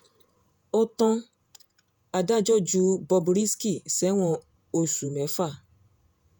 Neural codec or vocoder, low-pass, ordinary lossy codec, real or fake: vocoder, 48 kHz, 128 mel bands, Vocos; none; none; fake